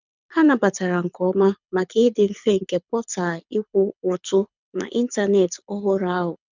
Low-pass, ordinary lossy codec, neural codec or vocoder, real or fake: 7.2 kHz; none; codec, 24 kHz, 6 kbps, HILCodec; fake